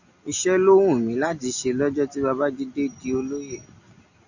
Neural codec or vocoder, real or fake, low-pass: none; real; 7.2 kHz